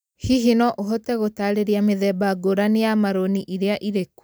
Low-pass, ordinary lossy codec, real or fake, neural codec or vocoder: none; none; real; none